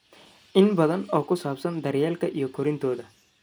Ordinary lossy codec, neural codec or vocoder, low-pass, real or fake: none; none; none; real